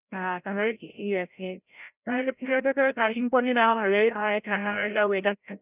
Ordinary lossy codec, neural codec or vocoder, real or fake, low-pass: none; codec, 16 kHz, 0.5 kbps, FreqCodec, larger model; fake; 3.6 kHz